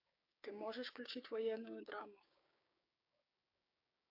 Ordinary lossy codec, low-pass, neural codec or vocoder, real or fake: AAC, 48 kbps; 5.4 kHz; codec, 16 kHz, 6 kbps, DAC; fake